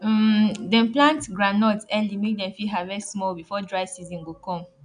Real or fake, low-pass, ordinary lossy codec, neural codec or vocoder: real; 9.9 kHz; none; none